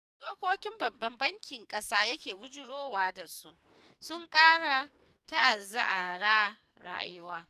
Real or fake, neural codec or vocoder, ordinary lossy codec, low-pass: fake; codec, 44.1 kHz, 2.6 kbps, SNAC; none; 14.4 kHz